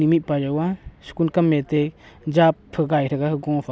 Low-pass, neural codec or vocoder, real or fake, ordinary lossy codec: none; none; real; none